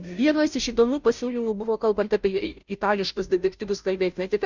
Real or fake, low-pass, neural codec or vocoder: fake; 7.2 kHz; codec, 16 kHz, 0.5 kbps, FunCodec, trained on Chinese and English, 25 frames a second